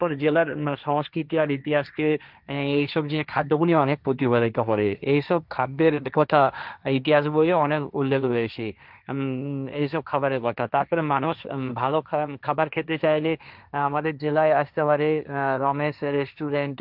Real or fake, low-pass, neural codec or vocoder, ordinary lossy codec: fake; 5.4 kHz; codec, 16 kHz, 1.1 kbps, Voila-Tokenizer; none